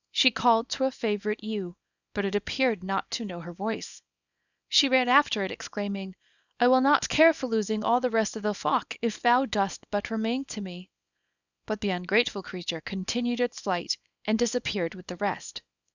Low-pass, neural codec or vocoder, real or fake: 7.2 kHz; codec, 24 kHz, 0.9 kbps, WavTokenizer, small release; fake